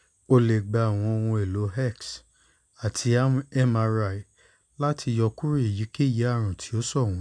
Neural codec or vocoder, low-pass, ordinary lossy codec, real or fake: none; 9.9 kHz; none; real